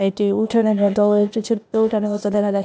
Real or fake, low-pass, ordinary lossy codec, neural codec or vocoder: fake; none; none; codec, 16 kHz, 0.8 kbps, ZipCodec